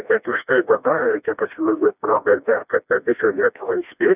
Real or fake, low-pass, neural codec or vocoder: fake; 3.6 kHz; codec, 16 kHz, 1 kbps, FreqCodec, smaller model